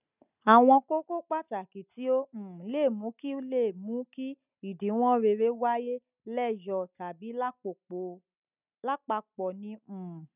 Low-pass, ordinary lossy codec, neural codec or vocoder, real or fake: 3.6 kHz; none; none; real